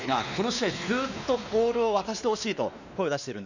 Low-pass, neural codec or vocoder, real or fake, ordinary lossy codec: 7.2 kHz; codec, 16 kHz, 2 kbps, X-Codec, WavLM features, trained on Multilingual LibriSpeech; fake; none